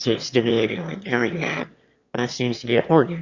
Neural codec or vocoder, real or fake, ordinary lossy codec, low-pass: autoencoder, 22.05 kHz, a latent of 192 numbers a frame, VITS, trained on one speaker; fake; Opus, 64 kbps; 7.2 kHz